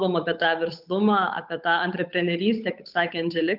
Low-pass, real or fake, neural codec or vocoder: 5.4 kHz; fake; codec, 16 kHz, 8 kbps, FunCodec, trained on Chinese and English, 25 frames a second